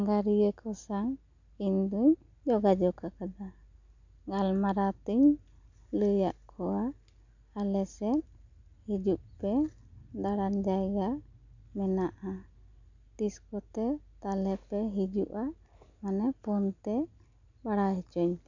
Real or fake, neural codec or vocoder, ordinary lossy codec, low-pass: real; none; none; 7.2 kHz